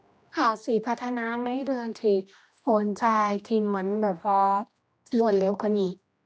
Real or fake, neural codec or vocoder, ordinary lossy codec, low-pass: fake; codec, 16 kHz, 1 kbps, X-Codec, HuBERT features, trained on general audio; none; none